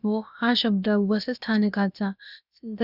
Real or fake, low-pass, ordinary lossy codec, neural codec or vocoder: fake; 5.4 kHz; none; codec, 16 kHz, about 1 kbps, DyCAST, with the encoder's durations